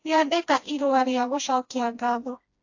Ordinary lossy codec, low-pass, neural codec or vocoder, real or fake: AAC, 48 kbps; 7.2 kHz; codec, 16 kHz, 1 kbps, FreqCodec, smaller model; fake